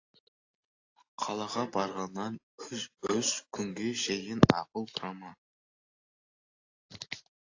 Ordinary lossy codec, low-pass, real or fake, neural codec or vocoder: AAC, 48 kbps; 7.2 kHz; real; none